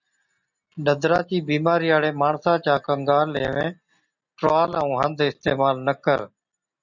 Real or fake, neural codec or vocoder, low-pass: real; none; 7.2 kHz